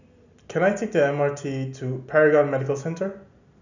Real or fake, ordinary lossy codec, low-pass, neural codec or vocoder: real; none; 7.2 kHz; none